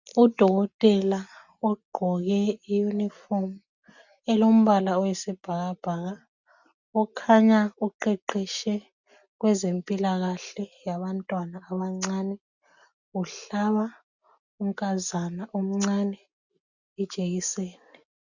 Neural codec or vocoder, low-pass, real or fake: none; 7.2 kHz; real